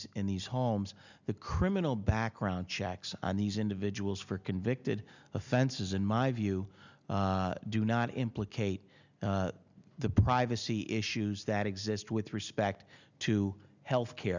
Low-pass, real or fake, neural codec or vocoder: 7.2 kHz; real; none